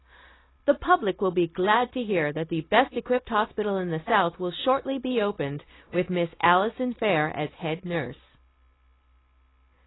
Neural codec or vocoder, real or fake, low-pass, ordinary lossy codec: none; real; 7.2 kHz; AAC, 16 kbps